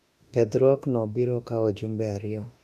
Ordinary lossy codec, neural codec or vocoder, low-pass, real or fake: none; autoencoder, 48 kHz, 32 numbers a frame, DAC-VAE, trained on Japanese speech; 14.4 kHz; fake